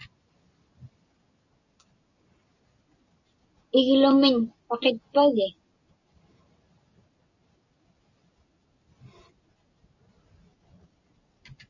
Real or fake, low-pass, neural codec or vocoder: real; 7.2 kHz; none